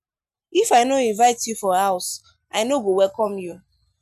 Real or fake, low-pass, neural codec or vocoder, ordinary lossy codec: real; 14.4 kHz; none; none